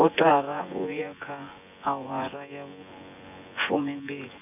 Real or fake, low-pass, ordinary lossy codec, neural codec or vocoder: fake; 3.6 kHz; none; vocoder, 24 kHz, 100 mel bands, Vocos